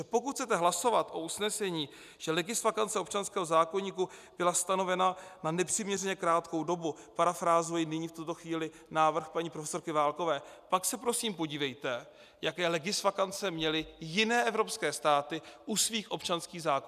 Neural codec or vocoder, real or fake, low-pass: none; real; 14.4 kHz